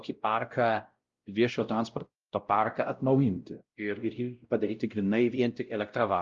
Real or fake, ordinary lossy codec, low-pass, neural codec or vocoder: fake; Opus, 32 kbps; 7.2 kHz; codec, 16 kHz, 0.5 kbps, X-Codec, WavLM features, trained on Multilingual LibriSpeech